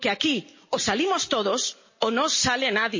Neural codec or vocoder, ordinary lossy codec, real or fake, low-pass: none; MP3, 32 kbps; real; 7.2 kHz